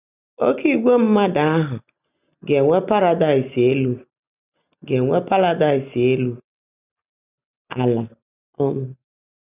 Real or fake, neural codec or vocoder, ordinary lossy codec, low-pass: real; none; none; 3.6 kHz